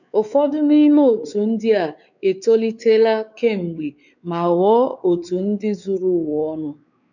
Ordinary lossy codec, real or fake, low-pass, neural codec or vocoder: none; fake; 7.2 kHz; codec, 16 kHz, 4 kbps, X-Codec, WavLM features, trained on Multilingual LibriSpeech